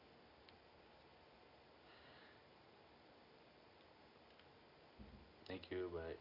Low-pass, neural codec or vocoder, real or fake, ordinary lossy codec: 5.4 kHz; none; real; AAC, 32 kbps